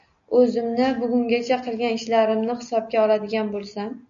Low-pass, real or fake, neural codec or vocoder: 7.2 kHz; real; none